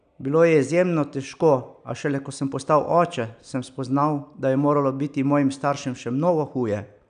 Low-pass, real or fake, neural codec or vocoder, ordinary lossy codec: 9.9 kHz; real; none; none